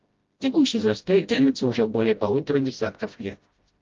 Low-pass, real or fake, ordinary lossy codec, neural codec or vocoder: 7.2 kHz; fake; Opus, 32 kbps; codec, 16 kHz, 0.5 kbps, FreqCodec, smaller model